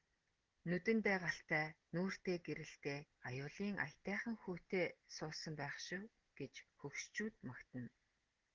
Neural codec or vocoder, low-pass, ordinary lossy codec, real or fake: none; 7.2 kHz; Opus, 16 kbps; real